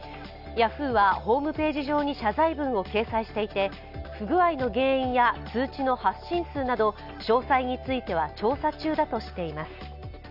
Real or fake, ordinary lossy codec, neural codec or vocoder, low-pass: real; none; none; 5.4 kHz